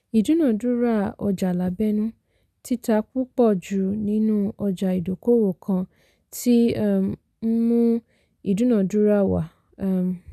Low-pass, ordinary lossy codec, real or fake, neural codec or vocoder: 14.4 kHz; none; real; none